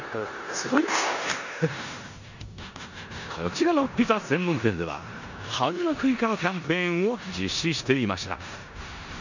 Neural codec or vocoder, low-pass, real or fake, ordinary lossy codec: codec, 16 kHz in and 24 kHz out, 0.9 kbps, LongCat-Audio-Codec, four codebook decoder; 7.2 kHz; fake; none